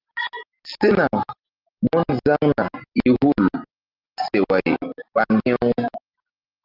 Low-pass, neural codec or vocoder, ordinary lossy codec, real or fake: 5.4 kHz; none; Opus, 24 kbps; real